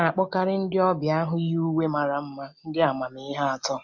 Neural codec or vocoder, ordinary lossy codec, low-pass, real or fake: none; MP3, 64 kbps; 7.2 kHz; real